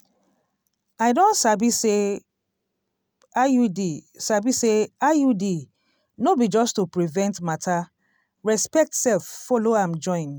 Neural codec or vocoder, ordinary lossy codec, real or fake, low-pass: none; none; real; none